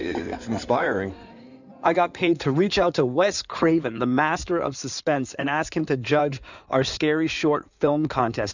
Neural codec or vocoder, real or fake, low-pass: codec, 16 kHz in and 24 kHz out, 2.2 kbps, FireRedTTS-2 codec; fake; 7.2 kHz